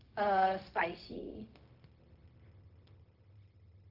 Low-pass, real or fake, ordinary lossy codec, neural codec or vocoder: 5.4 kHz; fake; Opus, 32 kbps; codec, 16 kHz, 0.4 kbps, LongCat-Audio-Codec